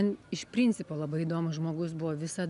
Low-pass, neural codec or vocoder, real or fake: 10.8 kHz; none; real